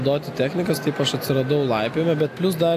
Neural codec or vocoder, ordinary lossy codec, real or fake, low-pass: none; AAC, 64 kbps; real; 14.4 kHz